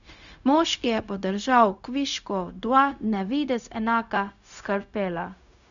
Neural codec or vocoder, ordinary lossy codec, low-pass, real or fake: codec, 16 kHz, 0.4 kbps, LongCat-Audio-Codec; none; 7.2 kHz; fake